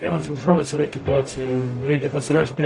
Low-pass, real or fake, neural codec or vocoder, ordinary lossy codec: 10.8 kHz; fake; codec, 44.1 kHz, 0.9 kbps, DAC; AAC, 48 kbps